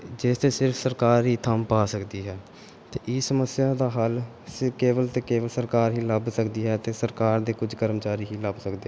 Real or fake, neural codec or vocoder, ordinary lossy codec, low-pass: real; none; none; none